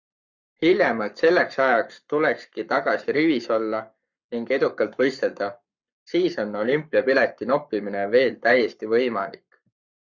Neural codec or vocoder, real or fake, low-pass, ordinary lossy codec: codec, 44.1 kHz, 7.8 kbps, Pupu-Codec; fake; 7.2 kHz; Opus, 64 kbps